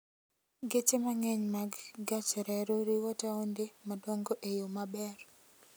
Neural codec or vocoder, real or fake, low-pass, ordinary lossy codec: none; real; none; none